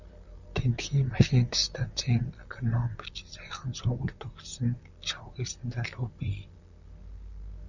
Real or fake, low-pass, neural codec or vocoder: fake; 7.2 kHz; vocoder, 44.1 kHz, 128 mel bands, Pupu-Vocoder